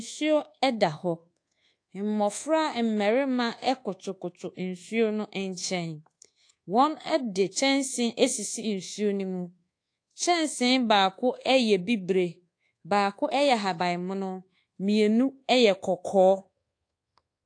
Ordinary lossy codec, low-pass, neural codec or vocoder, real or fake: AAC, 48 kbps; 9.9 kHz; codec, 24 kHz, 1.2 kbps, DualCodec; fake